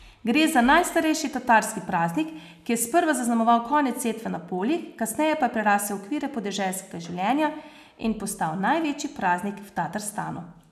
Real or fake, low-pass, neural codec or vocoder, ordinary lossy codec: real; 14.4 kHz; none; none